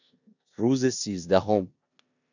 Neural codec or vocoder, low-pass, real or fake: codec, 16 kHz in and 24 kHz out, 0.9 kbps, LongCat-Audio-Codec, four codebook decoder; 7.2 kHz; fake